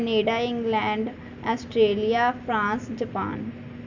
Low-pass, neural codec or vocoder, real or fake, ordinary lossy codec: 7.2 kHz; none; real; none